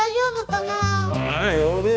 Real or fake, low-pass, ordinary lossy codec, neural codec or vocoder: fake; none; none; codec, 16 kHz, 2 kbps, X-Codec, HuBERT features, trained on balanced general audio